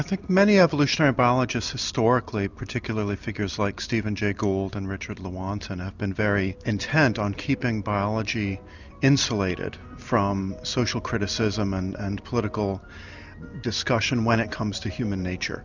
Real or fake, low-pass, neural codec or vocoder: real; 7.2 kHz; none